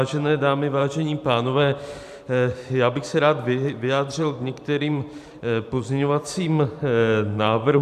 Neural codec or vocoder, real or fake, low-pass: vocoder, 44.1 kHz, 128 mel bands every 512 samples, BigVGAN v2; fake; 14.4 kHz